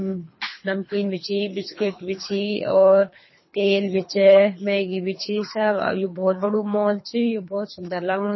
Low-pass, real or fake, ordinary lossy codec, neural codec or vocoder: 7.2 kHz; fake; MP3, 24 kbps; codec, 24 kHz, 3 kbps, HILCodec